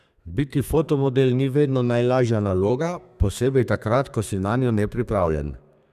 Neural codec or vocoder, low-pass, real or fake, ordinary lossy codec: codec, 32 kHz, 1.9 kbps, SNAC; 14.4 kHz; fake; none